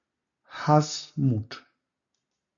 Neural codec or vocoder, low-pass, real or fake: none; 7.2 kHz; real